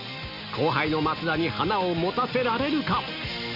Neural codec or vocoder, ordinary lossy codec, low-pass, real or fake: none; none; 5.4 kHz; real